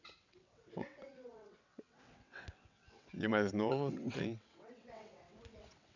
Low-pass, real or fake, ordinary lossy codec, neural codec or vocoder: 7.2 kHz; fake; none; vocoder, 22.05 kHz, 80 mel bands, WaveNeXt